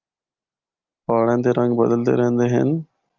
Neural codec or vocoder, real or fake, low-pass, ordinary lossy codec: none; real; 7.2 kHz; Opus, 32 kbps